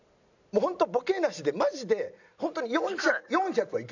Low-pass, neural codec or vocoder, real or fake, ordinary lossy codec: 7.2 kHz; none; real; none